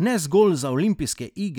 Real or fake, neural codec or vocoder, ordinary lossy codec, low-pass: real; none; none; 19.8 kHz